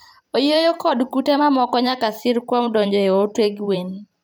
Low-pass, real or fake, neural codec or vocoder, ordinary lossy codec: none; fake; vocoder, 44.1 kHz, 128 mel bands every 512 samples, BigVGAN v2; none